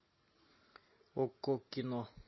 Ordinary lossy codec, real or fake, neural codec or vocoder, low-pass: MP3, 24 kbps; real; none; 7.2 kHz